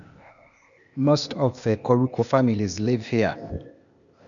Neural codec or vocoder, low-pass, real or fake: codec, 16 kHz, 0.8 kbps, ZipCodec; 7.2 kHz; fake